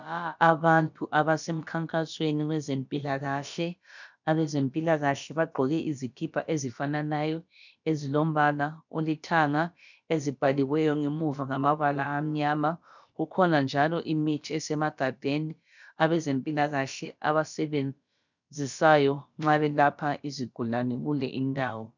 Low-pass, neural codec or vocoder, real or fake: 7.2 kHz; codec, 16 kHz, about 1 kbps, DyCAST, with the encoder's durations; fake